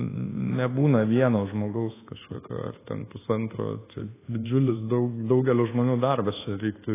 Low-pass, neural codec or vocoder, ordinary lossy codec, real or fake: 3.6 kHz; codec, 24 kHz, 1.2 kbps, DualCodec; AAC, 16 kbps; fake